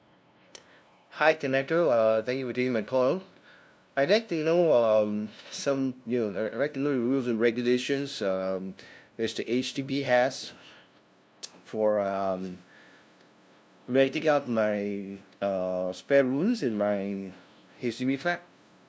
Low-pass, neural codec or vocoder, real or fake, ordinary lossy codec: none; codec, 16 kHz, 0.5 kbps, FunCodec, trained on LibriTTS, 25 frames a second; fake; none